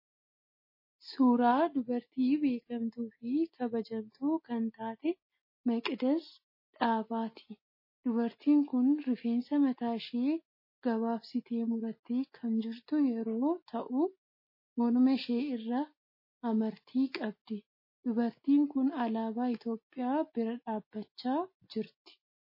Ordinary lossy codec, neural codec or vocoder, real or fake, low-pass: MP3, 24 kbps; none; real; 5.4 kHz